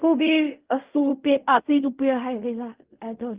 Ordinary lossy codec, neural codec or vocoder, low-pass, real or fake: Opus, 32 kbps; codec, 16 kHz in and 24 kHz out, 0.4 kbps, LongCat-Audio-Codec, fine tuned four codebook decoder; 3.6 kHz; fake